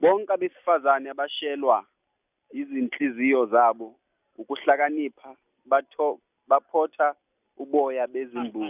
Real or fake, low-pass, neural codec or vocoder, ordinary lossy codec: real; 3.6 kHz; none; none